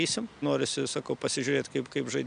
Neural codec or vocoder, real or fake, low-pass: none; real; 9.9 kHz